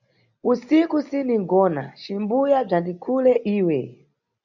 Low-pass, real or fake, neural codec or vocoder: 7.2 kHz; real; none